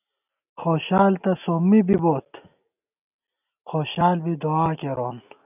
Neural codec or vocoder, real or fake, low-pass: none; real; 3.6 kHz